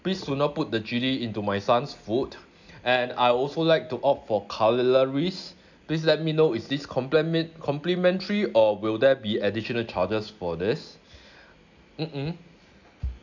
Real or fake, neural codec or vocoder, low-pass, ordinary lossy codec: real; none; 7.2 kHz; none